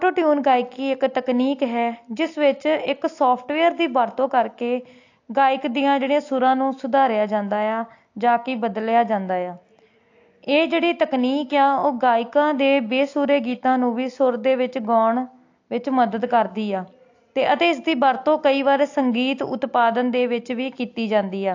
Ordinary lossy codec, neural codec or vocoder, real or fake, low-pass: AAC, 48 kbps; none; real; 7.2 kHz